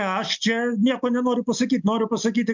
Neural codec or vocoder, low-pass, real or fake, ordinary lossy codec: none; 7.2 kHz; real; MP3, 64 kbps